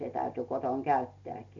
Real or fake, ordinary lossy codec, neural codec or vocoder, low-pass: real; AAC, 48 kbps; none; 7.2 kHz